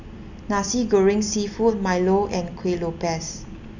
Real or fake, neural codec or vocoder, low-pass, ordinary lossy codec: real; none; 7.2 kHz; none